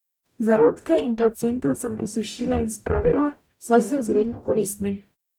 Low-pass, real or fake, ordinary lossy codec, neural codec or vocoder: 19.8 kHz; fake; none; codec, 44.1 kHz, 0.9 kbps, DAC